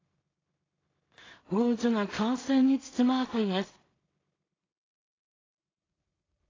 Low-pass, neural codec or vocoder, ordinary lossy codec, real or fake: 7.2 kHz; codec, 16 kHz in and 24 kHz out, 0.4 kbps, LongCat-Audio-Codec, two codebook decoder; AAC, 32 kbps; fake